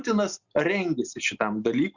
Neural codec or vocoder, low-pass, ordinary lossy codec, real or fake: none; 7.2 kHz; Opus, 64 kbps; real